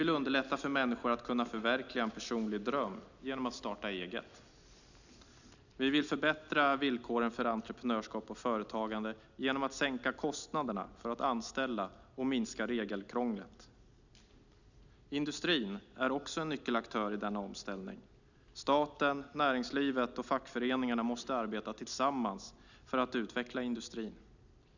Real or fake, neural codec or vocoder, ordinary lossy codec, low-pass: real; none; none; 7.2 kHz